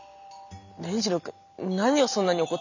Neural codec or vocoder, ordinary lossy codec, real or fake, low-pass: vocoder, 44.1 kHz, 128 mel bands every 256 samples, BigVGAN v2; none; fake; 7.2 kHz